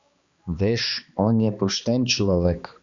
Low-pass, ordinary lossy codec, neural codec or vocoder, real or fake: 7.2 kHz; Opus, 64 kbps; codec, 16 kHz, 2 kbps, X-Codec, HuBERT features, trained on balanced general audio; fake